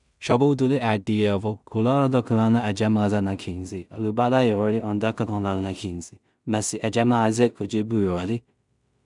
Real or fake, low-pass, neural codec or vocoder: fake; 10.8 kHz; codec, 16 kHz in and 24 kHz out, 0.4 kbps, LongCat-Audio-Codec, two codebook decoder